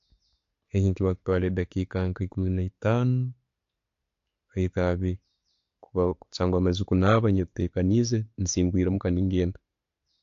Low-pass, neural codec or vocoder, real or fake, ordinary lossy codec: 7.2 kHz; none; real; AAC, 48 kbps